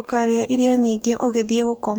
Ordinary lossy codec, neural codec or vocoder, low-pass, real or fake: none; codec, 44.1 kHz, 2.6 kbps, DAC; none; fake